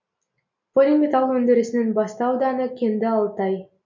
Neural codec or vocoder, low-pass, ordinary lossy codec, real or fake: none; 7.2 kHz; MP3, 64 kbps; real